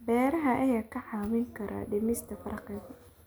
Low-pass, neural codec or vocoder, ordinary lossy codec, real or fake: none; none; none; real